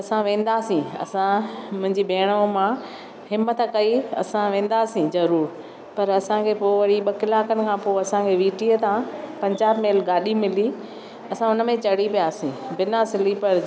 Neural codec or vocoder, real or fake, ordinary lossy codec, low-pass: none; real; none; none